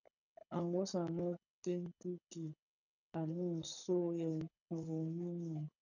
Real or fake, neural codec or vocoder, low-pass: fake; codec, 24 kHz, 6 kbps, HILCodec; 7.2 kHz